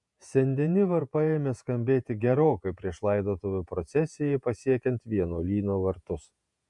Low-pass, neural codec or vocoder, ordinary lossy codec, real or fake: 10.8 kHz; vocoder, 48 kHz, 128 mel bands, Vocos; MP3, 96 kbps; fake